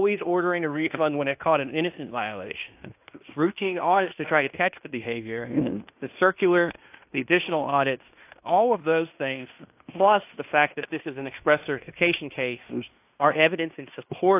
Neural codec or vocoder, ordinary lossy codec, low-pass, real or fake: codec, 16 kHz in and 24 kHz out, 0.9 kbps, LongCat-Audio-Codec, fine tuned four codebook decoder; AAC, 32 kbps; 3.6 kHz; fake